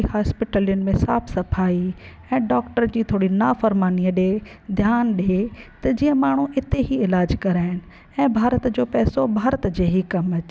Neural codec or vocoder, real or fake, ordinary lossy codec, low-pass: none; real; none; none